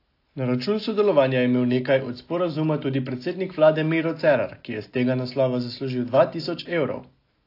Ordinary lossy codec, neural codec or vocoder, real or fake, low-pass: AAC, 32 kbps; none; real; 5.4 kHz